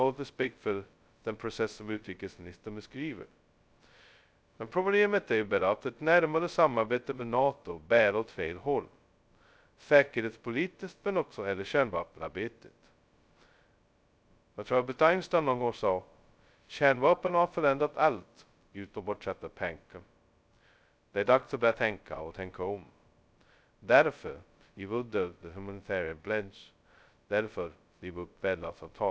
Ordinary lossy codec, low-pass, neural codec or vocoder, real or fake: none; none; codec, 16 kHz, 0.2 kbps, FocalCodec; fake